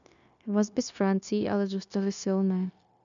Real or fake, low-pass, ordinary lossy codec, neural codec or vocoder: fake; 7.2 kHz; MP3, 96 kbps; codec, 16 kHz, 0.9 kbps, LongCat-Audio-Codec